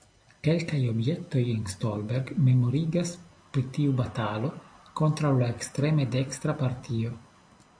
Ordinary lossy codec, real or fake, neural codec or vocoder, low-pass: AAC, 64 kbps; fake; vocoder, 44.1 kHz, 128 mel bands every 256 samples, BigVGAN v2; 9.9 kHz